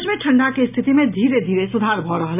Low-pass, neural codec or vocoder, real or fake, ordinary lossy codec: 3.6 kHz; none; real; none